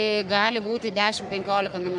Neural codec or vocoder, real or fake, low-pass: codec, 44.1 kHz, 3.4 kbps, Pupu-Codec; fake; 10.8 kHz